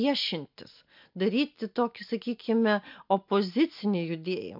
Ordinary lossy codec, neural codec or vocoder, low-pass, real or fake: MP3, 48 kbps; none; 5.4 kHz; real